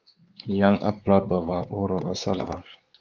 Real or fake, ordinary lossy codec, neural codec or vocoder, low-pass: fake; Opus, 32 kbps; codec, 16 kHz, 4 kbps, X-Codec, WavLM features, trained on Multilingual LibriSpeech; 7.2 kHz